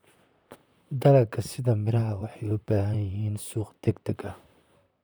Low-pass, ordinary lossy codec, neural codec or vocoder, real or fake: none; none; vocoder, 44.1 kHz, 128 mel bands, Pupu-Vocoder; fake